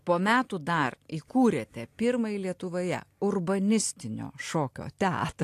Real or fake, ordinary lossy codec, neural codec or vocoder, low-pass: real; AAC, 64 kbps; none; 14.4 kHz